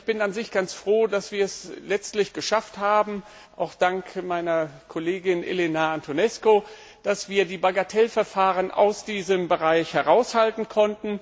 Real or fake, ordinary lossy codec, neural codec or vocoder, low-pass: real; none; none; none